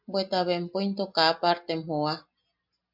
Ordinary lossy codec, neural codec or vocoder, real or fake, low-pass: MP3, 48 kbps; none; real; 5.4 kHz